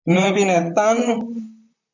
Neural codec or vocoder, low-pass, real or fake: vocoder, 44.1 kHz, 128 mel bands, Pupu-Vocoder; 7.2 kHz; fake